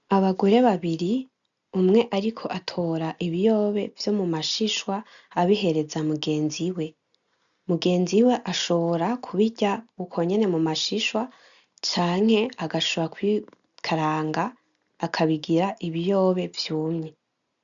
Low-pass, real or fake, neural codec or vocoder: 7.2 kHz; real; none